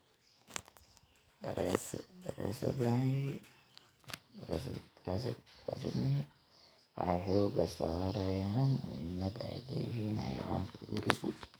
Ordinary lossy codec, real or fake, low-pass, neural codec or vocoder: none; fake; none; codec, 44.1 kHz, 2.6 kbps, SNAC